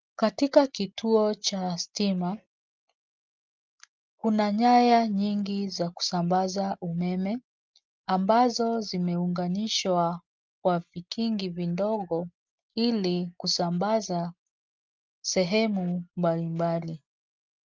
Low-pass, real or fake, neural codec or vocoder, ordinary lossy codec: 7.2 kHz; real; none; Opus, 24 kbps